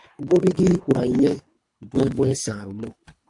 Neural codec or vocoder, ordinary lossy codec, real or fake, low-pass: codec, 24 kHz, 3 kbps, HILCodec; AAC, 64 kbps; fake; 10.8 kHz